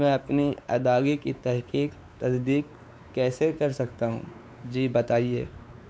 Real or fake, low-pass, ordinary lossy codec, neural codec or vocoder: fake; none; none; codec, 16 kHz, 4 kbps, X-Codec, WavLM features, trained on Multilingual LibriSpeech